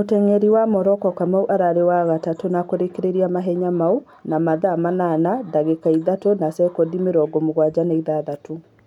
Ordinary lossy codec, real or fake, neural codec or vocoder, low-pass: none; real; none; 19.8 kHz